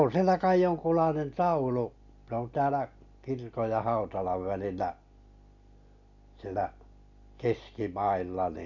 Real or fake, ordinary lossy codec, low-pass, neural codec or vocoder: real; none; 7.2 kHz; none